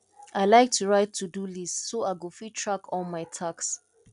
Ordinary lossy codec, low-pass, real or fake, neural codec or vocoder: none; 10.8 kHz; real; none